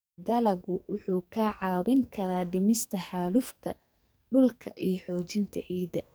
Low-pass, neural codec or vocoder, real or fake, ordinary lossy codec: none; codec, 44.1 kHz, 2.6 kbps, SNAC; fake; none